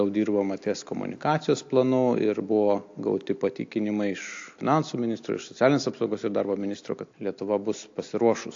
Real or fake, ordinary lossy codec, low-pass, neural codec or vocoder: real; AAC, 48 kbps; 7.2 kHz; none